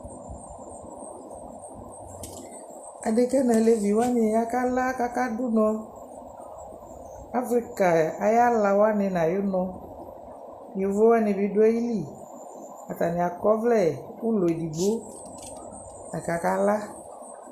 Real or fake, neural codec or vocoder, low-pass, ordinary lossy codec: real; none; 14.4 kHz; Opus, 64 kbps